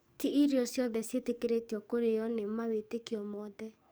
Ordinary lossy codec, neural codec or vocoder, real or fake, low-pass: none; codec, 44.1 kHz, 7.8 kbps, DAC; fake; none